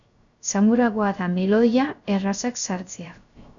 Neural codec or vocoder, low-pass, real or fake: codec, 16 kHz, 0.3 kbps, FocalCodec; 7.2 kHz; fake